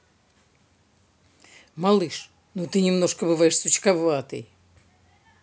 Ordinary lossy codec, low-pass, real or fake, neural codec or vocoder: none; none; real; none